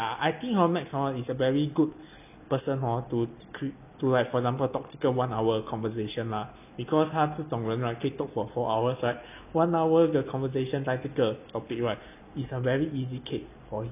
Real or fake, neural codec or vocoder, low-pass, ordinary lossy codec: real; none; 3.6 kHz; none